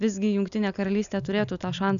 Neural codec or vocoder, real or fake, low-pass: none; real; 7.2 kHz